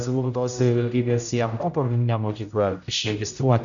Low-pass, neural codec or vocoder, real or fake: 7.2 kHz; codec, 16 kHz, 0.5 kbps, X-Codec, HuBERT features, trained on general audio; fake